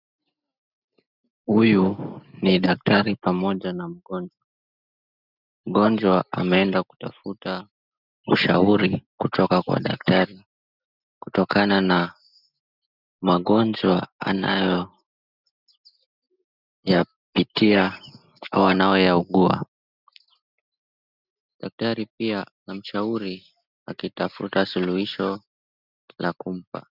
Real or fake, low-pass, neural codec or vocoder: real; 5.4 kHz; none